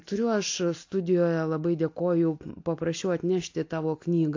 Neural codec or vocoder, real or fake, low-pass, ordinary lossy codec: none; real; 7.2 kHz; AAC, 48 kbps